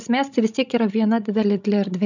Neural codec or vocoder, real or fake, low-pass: codec, 16 kHz, 16 kbps, FreqCodec, larger model; fake; 7.2 kHz